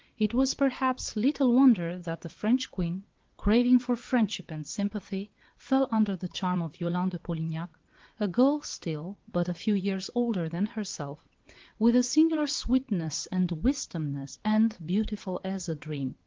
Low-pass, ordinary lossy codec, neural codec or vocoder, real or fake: 7.2 kHz; Opus, 16 kbps; vocoder, 22.05 kHz, 80 mel bands, WaveNeXt; fake